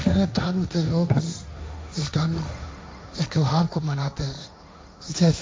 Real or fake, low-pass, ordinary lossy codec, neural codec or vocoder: fake; none; none; codec, 16 kHz, 1.1 kbps, Voila-Tokenizer